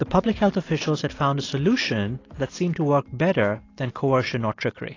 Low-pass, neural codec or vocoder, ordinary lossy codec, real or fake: 7.2 kHz; none; AAC, 32 kbps; real